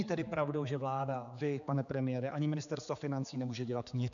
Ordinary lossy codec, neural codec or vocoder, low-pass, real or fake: MP3, 96 kbps; codec, 16 kHz, 4 kbps, X-Codec, HuBERT features, trained on general audio; 7.2 kHz; fake